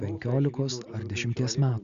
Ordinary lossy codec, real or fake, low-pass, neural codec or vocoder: Opus, 64 kbps; real; 7.2 kHz; none